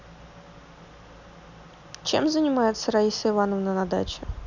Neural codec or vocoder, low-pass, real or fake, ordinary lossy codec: none; 7.2 kHz; real; none